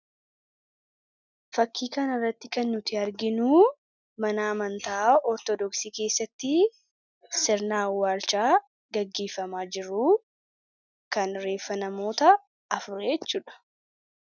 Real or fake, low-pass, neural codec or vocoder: real; 7.2 kHz; none